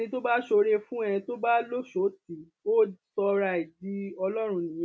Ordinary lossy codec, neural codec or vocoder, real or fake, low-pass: none; none; real; none